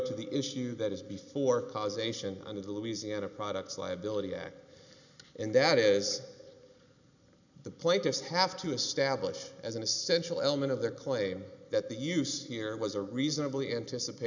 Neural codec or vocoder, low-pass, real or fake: none; 7.2 kHz; real